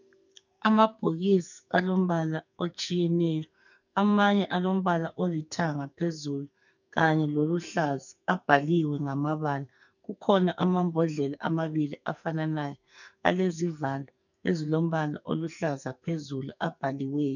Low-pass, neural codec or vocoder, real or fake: 7.2 kHz; codec, 44.1 kHz, 2.6 kbps, SNAC; fake